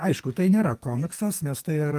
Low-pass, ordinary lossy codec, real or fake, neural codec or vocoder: 14.4 kHz; Opus, 32 kbps; fake; codec, 44.1 kHz, 2.6 kbps, SNAC